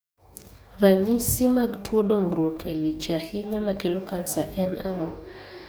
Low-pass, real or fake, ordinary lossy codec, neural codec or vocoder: none; fake; none; codec, 44.1 kHz, 2.6 kbps, DAC